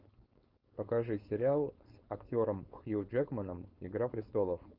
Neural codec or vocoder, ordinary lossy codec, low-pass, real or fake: codec, 16 kHz, 4.8 kbps, FACodec; Opus, 32 kbps; 5.4 kHz; fake